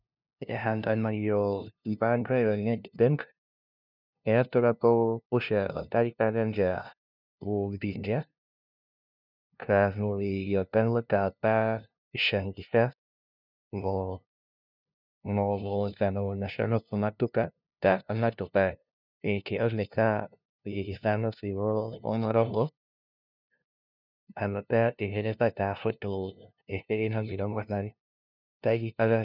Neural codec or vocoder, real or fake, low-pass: codec, 16 kHz, 0.5 kbps, FunCodec, trained on LibriTTS, 25 frames a second; fake; 5.4 kHz